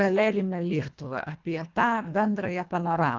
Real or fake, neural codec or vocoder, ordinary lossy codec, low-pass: fake; codec, 24 kHz, 1.5 kbps, HILCodec; Opus, 32 kbps; 7.2 kHz